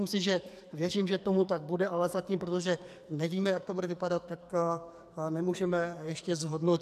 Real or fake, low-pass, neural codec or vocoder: fake; 14.4 kHz; codec, 44.1 kHz, 2.6 kbps, SNAC